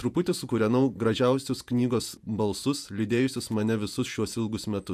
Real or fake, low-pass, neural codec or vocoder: fake; 14.4 kHz; vocoder, 48 kHz, 128 mel bands, Vocos